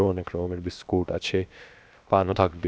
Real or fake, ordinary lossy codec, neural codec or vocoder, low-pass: fake; none; codec, 16 kHz, about 1 kbps, DyCAST, with the encoder's durations; none